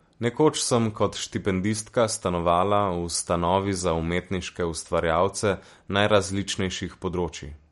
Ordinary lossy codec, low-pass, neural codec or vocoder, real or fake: MP3, 48 kbps; 19.8 kHz; none; real